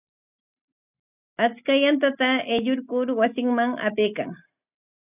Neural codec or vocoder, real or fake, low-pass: none; real; 3.6 kHz